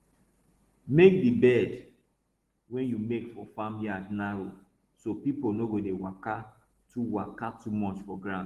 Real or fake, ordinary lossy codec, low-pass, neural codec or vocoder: real; Opus, 16 kbps; 14.4 kHz; none